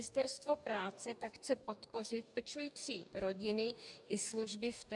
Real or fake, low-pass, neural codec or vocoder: fake; 10.8 kHz; codec, 44.1 kHz, 2.6 kbps, DAC